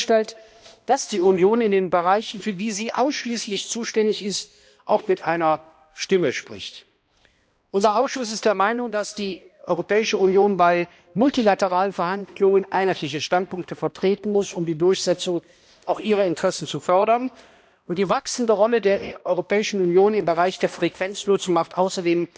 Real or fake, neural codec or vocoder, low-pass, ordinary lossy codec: fake; codec, 16 kHz, 1 kbps, X-Codec, HuBERT features, trained on balanced general audio; none; none